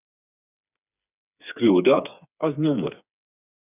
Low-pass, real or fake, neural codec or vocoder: 3.6 kHz; fake; codec, 16 kHz, 4 kbps, FreqCodec, smaller model